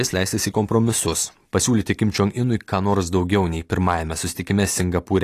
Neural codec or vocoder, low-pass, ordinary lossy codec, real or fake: none; 14.4 kHz; AAC, 48 kbps; real